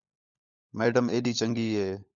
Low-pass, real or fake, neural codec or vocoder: 7.2 kHz; fake; codec, 16 kHz, 16 kbps, FunCodec, trained on LibriTTS, 50 frames a second